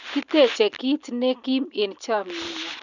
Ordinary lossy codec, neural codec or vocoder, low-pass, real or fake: none; none; 7.2 kHz; real